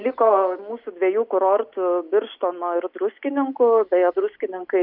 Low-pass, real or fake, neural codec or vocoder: 5.4 kHz; real; none